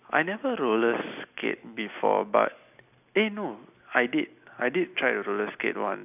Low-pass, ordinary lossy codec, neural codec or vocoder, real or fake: 3.6 kHz; none; none; real